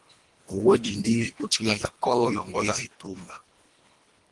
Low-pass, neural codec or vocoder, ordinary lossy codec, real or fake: 10.8 kHz; codec, 24 kHz, 1.5 kbps, HILCodec; Opus, 24 kbps; fake